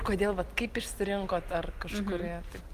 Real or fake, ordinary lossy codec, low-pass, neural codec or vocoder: real; Opus, 24 kbps; 14.4 kHz; none